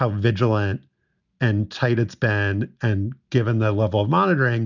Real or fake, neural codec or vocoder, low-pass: real; none; 7.2 kHz